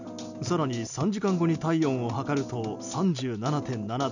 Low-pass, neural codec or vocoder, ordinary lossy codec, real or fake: 7.2 kHz; none; none; real